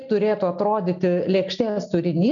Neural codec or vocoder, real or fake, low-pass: none; real; 7.2 kHz